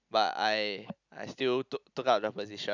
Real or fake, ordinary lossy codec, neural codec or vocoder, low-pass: real; none; none; 7.2 kHz